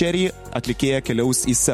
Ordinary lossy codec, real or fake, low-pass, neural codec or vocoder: MP3, 64 kbps; real; 19.8 kHz; none